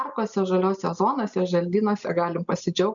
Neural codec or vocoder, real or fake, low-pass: none; real; 7.2 kHz